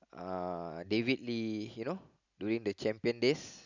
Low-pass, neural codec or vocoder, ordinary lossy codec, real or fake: 7.2 kHz; none; none; real